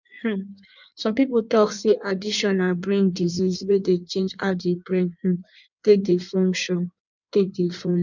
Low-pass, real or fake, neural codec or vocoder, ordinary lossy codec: 7.2 kHz; fake; codec, 16 kHz in and 24 kHz out, 1.1 kbps, FireRedTTS-2 codec; none